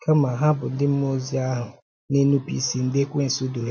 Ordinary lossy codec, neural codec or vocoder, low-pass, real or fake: none; none; none; real